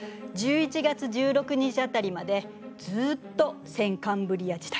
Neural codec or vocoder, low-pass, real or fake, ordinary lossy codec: none; none; real; none